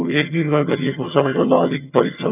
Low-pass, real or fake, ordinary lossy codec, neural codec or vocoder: 3.6 kHz; fake; none; vocoder, 22.05 kHz, 80 mel bands, HiFi-GAN